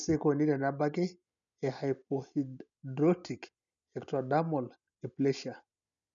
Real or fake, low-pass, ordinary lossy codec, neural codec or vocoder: real; 7.2 kHz; none; none